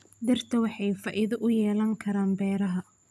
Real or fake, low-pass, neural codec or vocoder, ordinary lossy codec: real; none; none; none